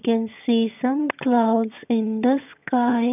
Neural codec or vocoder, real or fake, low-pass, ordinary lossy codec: vocoder, 22.05 kHz, 80 mel bands, HiFi-GAN; fake; 3.6 kHz; none